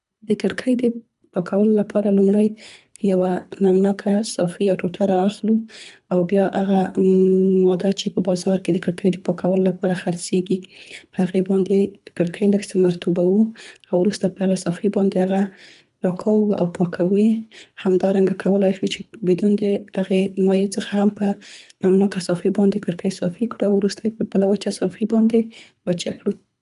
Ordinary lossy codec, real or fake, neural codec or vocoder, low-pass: none; fake; codec, 24 kHz, 3 kbps, HILCodec; 10.8 kHz